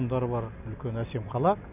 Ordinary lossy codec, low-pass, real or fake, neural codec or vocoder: none; 3.6 kHz; real; none